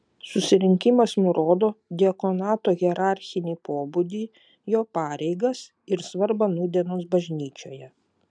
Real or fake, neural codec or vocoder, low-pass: real; none; 9.9 kHz